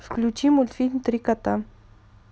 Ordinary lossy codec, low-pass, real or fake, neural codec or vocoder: none; none; real; none